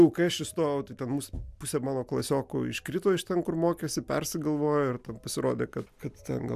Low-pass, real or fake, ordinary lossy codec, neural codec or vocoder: 14.4 kHz; real; MP3, 96 kbps; none